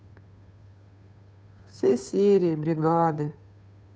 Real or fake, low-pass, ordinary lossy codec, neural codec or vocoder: fake; none; none; codec, 16 kHz, 2 kbps, FunCodec, trained on Chinese and English, 25 frames a second